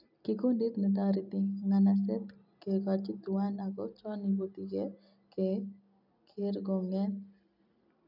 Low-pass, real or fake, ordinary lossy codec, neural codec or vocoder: 5.4 kHz; real; none; none